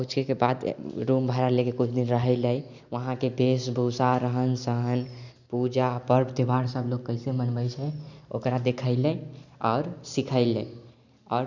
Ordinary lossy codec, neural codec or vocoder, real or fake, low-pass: none; none; real; 7.2 kHz